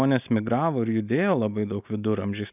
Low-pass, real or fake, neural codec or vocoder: 3.6 kHz; real; none